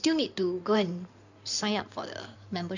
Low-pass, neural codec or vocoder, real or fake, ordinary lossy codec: 7.2 kHz; codec, 16 kHz in and 24 kHz out, 2.2 kbps, FireRedTTS-2 codec; fake; none